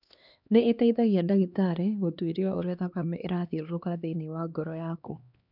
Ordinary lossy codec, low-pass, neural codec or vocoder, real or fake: none; 5.4 kHz; codec, 16 kHz, 2 kbps, X-Codec, HuBERT features, trained on LibriSpeech; fake